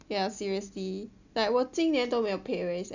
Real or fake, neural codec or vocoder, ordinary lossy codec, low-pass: real; none; none; 7.2 kHz